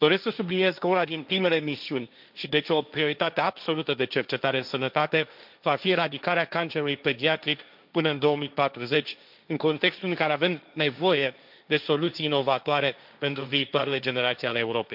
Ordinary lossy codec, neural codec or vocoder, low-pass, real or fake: none; codec, 16 kHz, 1.1 kbps, Voila-Tokenizer; 5.4 kHz; fake